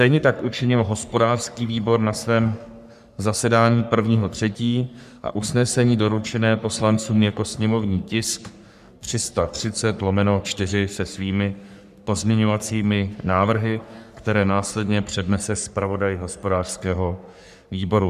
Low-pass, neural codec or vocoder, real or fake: 14.4 kHz; codec, 44.1 kHz, 3.4 kbps, Pupu-Codec; fake